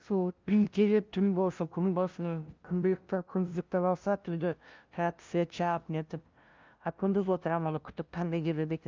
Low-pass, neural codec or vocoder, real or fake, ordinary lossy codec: 7.2 kHz; codec, 16 kHz, 0.5 kbps, FunCodec, trained on LibriTTS, 25 frames a second; fake; Opus, 24 kbps